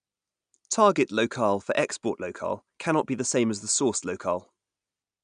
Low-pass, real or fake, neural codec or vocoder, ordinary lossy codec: 9.9 kHz; real; none; none